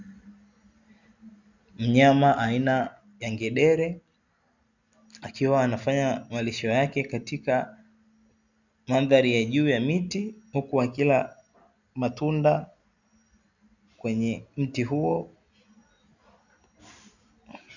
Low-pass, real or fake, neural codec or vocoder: 7.2 kHz; real; none